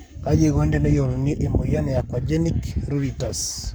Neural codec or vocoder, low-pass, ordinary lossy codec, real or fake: codec, 44.1 kHz, 7.8 kbps, Pupu-Codec; none; none; fake